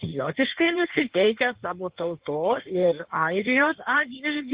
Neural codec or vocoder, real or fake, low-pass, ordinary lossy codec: codec, 16 kHz in and 24 kHz out, 1.1 kbps, FireRedTTS-2 codec; fake; 3.6 kHz; Opus, 16 kbps